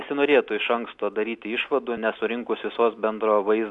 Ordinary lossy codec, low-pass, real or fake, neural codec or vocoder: AAC, 64 kbps; 10.8 kHz; real; none